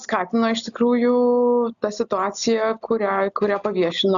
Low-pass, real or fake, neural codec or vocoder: 7.2 kHz; real; none